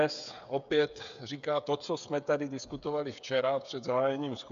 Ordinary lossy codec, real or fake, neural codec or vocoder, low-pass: AAC, 96 kbps; fake; codec, 16 kHz, 8 kbps, FreqCodec, smaller model; 7.2 kHz